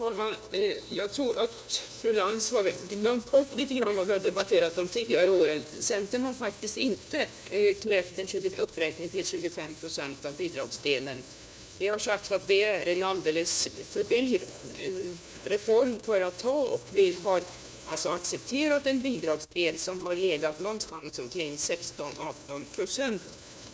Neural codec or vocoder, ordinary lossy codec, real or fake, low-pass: codec, 16 kHz, 1 kbps, FunCodec, trained on LibriTTS, 50 frames a second; none; fake; none